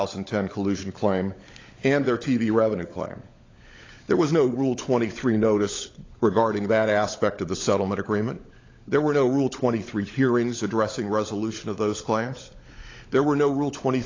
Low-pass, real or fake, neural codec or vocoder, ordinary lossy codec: 7.2 kHz; fake; codec, 16 kHz, 16 kbps, FunCodec, trained on LibriTTS, 50 frames a second; AAC, 32 kbps